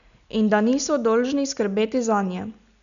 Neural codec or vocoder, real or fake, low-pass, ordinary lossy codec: none; real; 7.2 kHz; none